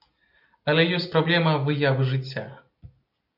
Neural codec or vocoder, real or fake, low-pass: none; real; 5.4 kHz